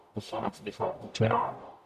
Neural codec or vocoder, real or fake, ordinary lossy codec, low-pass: codec, 44.1 kHz, 0.9 kbps, DAC; fake; MP3, 96 kbps; 14.4 kHz